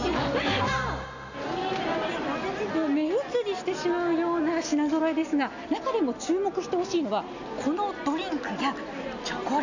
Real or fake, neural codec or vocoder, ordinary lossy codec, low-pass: real; none; none; 7.2 kHz